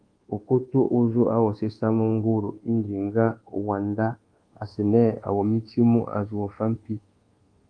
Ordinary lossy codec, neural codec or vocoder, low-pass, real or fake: Opus, 24 kbps; codec, 24 kHz, 1.2 kbps, DualCodec; 9.9 kHz; fake